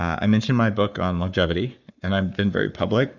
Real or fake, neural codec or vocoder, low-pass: fake; codec, 44.1 kHz, 7.8 kbps, Pupu-Codec; 7.2 kHz